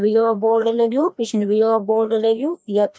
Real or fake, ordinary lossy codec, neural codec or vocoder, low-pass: fake; none; codec, 16 kHz, 1 kbps, FreqCodec, larger model; none